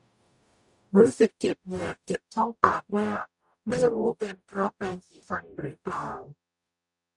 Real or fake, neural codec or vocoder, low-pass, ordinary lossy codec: fake; codec, 44.1 kHz, 0.9 kbps, DAC; 10.8 kHz; none